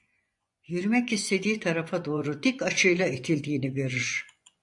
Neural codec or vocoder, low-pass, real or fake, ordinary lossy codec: vocoder, 24 kHz, 100 mel bands, Vocos; 10.8 kHz; fake; AAC, 64 kbps